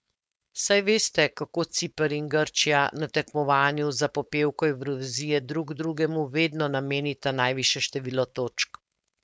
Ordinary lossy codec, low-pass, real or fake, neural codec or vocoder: none; none; fake; codec, 16 kHz, 4.8 kbps, FACodec